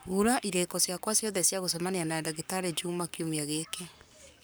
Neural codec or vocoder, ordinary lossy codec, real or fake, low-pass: codec, 44.1 kHz, 7.8 kbps, Pupu-Codec; none; fake; none